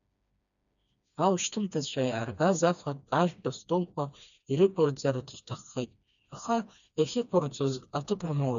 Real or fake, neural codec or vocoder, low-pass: fake; codec, 16 kHz, 2 kbps, FreqCodec, smaller model; 7.2 kHz